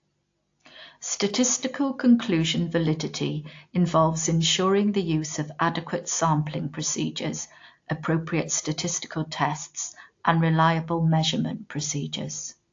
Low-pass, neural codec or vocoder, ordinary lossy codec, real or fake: 7.2 kHz; none; AAC, 48 kbps; real